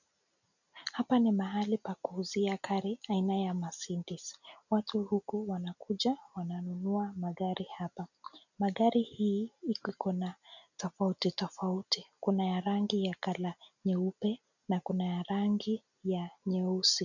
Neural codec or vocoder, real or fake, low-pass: none; real; 7.2 kHz